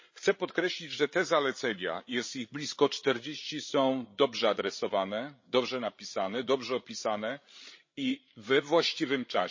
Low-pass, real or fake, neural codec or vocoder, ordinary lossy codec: 7.2 kHz; fake; codec, 16 kHz, 16 kbps, FreqCodec, larger model; MP3, 32 kbps